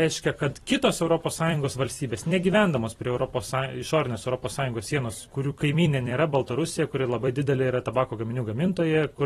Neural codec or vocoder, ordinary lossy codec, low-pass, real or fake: vocoder, 44.1 kHz, 128 mel bands every 256 samples, BigVGAN v2; AAC, 32 kbps; 19.8 kHz; fake